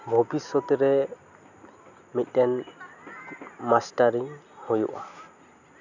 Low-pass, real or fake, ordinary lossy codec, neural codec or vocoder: 7.2 kHz; real; none; none